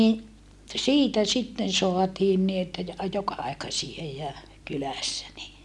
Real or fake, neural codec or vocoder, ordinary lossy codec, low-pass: real; none; none; none